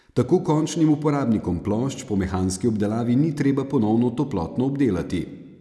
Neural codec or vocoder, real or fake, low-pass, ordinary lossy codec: none; real; none; none